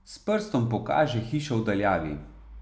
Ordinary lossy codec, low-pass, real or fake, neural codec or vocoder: none; none; real; none